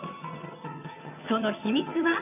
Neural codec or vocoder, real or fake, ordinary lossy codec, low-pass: vocoder, 22.05 kHz, 80 mel bands, HiFi-GAN; fake; none; 3.6 kHz